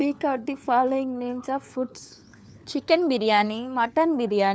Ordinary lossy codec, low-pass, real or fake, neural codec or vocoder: none; none; fake; codec, 16 kHz, 4 kbps, FunCodec, trained on LibriTTS, 50 frames a second